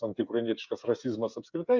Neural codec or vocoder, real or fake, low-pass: codec, 16 kHz, 8 kbps, FreqCodec, smaller model; fake; 7.2 kHz